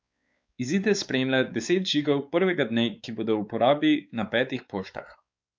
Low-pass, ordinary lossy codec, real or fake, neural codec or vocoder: none; none; fake; codec, 16 kHz, 4 kbps, X-Codec, WavLM features, trained on Multilingual LibriSpeech